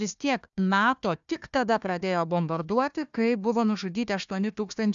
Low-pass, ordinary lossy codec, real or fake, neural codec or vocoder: 7.2 kHz; MP3, 96 kbps; fake; codec, 16 kHz, 1 kbps, FunCodec, trained on Chinese and English, 50 frames a second